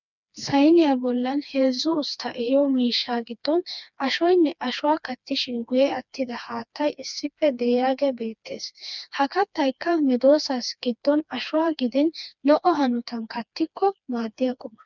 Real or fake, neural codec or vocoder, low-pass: fake; codec, 16 kHz, 2 kbps, FreqCodec, smaller model; 7.2 kHz